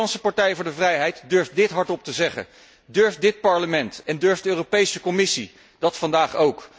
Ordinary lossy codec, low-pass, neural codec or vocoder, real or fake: none; none; none; real